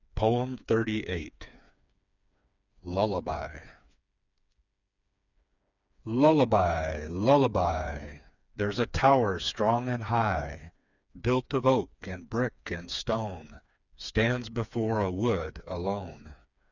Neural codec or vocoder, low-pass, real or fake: codec, 16 kHz, 4 kbps, FreqCodec, smaller model; 7.2 kHz; fake